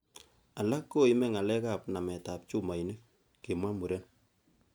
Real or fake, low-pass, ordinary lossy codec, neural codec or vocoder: real; none; none; none